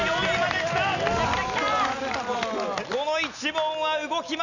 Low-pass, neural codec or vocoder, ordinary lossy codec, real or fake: 7.2 kHz; none; none; real